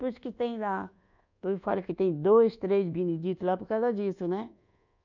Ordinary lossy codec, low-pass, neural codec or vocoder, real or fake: none; 7.2 kHz; codec, 24 kHz, 1.2 kbps, DualCodec; fake